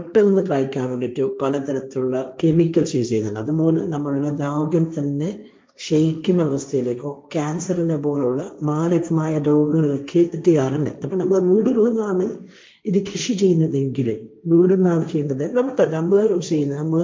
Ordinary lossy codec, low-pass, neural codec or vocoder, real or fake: none; none; codec, 16 kHz, 1.1 kbps, Voila-Tokenizer; fake